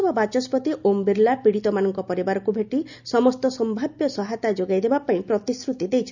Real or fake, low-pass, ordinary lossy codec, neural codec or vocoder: real; 7.2 kHz; none; none